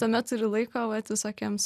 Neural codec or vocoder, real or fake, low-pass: none; real; 14.4 kHz